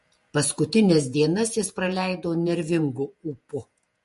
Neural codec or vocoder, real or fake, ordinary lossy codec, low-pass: vocoder, 48 kHz, 128 mel bands, Vocos; fake; MP3, 48 kbps; 14.4 kHz